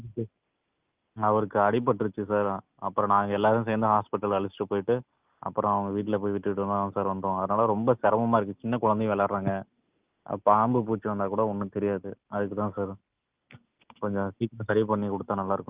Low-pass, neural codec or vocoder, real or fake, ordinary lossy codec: 3.6 kHz; none; real; Opus, 24 kbps